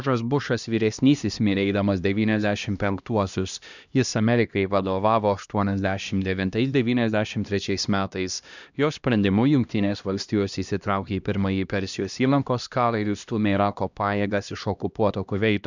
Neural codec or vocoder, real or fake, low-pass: codec, 16 kHz, 1 kbps, X-Codec, HuBERT features, trained on LibriSpeech; fake; 7.2 kHz